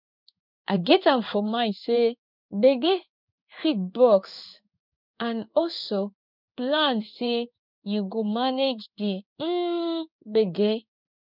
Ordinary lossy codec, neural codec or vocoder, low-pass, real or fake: none; codec, 16 kHz in and 24 kHz out, 1 kbps, XY-Tokenizer; 5.4 kHz; fake